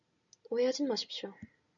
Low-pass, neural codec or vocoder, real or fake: 7.2 kHz; none; real